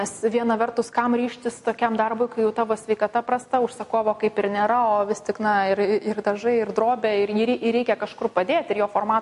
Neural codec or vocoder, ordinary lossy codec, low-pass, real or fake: none; MP3, 48 kbps; 14.4 kHz; real